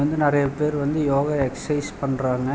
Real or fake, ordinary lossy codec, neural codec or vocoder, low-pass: real; none; none; none